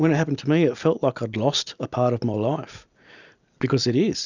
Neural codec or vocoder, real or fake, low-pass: none; real; 7.2 kHz